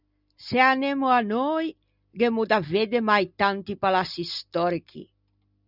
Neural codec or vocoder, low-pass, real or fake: none; 5.4 kHz; real